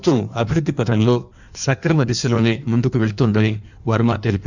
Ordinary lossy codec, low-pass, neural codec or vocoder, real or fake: none; 7.2 kHz; codec, 16 kHz in and 24 kHz out, 1.1 kbps, FireRedTTS-2 codec; fake